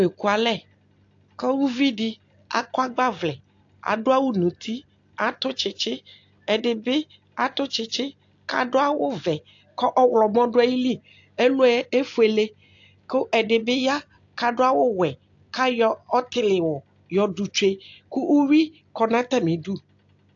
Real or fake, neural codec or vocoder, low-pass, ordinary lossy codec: real; none; 7.2 kHz; AAC, 48 kbps